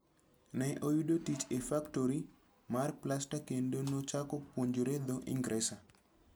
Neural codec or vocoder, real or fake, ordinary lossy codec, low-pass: none; real; none; none